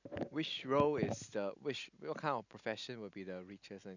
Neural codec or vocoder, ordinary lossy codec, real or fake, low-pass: none; none; real; 7.2 kHz